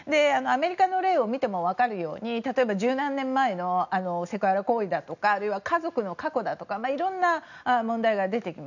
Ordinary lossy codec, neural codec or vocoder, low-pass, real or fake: none; none; 7.2 kHz; real